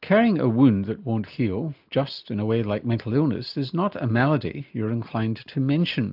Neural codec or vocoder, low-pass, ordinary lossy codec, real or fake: none; 5.4 kHz; AAC, 48 kbps; real